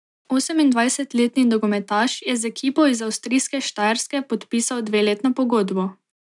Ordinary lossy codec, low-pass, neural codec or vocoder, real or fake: none; 10.8 kHz; none; real